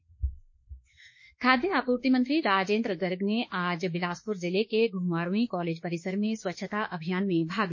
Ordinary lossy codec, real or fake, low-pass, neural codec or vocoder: MP3, 32 kbps; fake; 7.2 kHz; autoencoder, 48 kHz, 32 numbers a frame, DAC-VAE, trained on Japanese speech